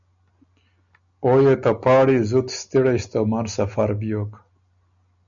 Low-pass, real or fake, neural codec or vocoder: 7.2 kHz; real; none